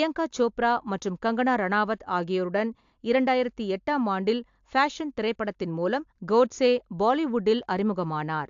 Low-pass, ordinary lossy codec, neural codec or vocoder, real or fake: 7.2 kHz; AAC, 64 kbps; none; real